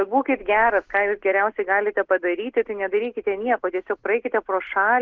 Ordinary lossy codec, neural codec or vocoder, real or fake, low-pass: Opus, 16 kbps; none; real; 7.2 kHz